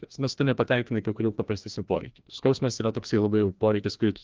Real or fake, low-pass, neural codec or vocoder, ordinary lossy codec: fake; 7.2 kHz; codec, 16 kHz, 1 kbps, FreqCodec, larger model; Opus, 24 kbps